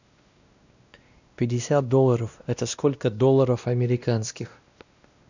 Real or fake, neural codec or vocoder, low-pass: fake; codec, 16 kHz, 1 kbps, X-Codec, WavLM features, trained on Multilingual LibriSpeech; 7.2 kHz